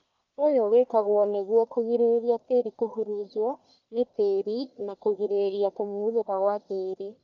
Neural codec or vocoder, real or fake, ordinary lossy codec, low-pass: codec, 44.1 kHz, 1.7 kbps, Pupu-Codec; fake; none; 7.2 kHz